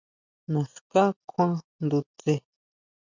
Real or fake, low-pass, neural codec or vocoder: real; 7.2 kHz; none